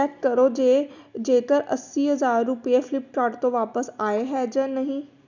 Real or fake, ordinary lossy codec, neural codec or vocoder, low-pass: real; none; none; 7.2 kHz